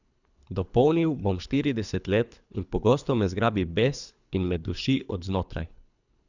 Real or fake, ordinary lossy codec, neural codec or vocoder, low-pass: fake; none; codec, 24 kHz, 3 kbps, HILCodec; 7.2 kHz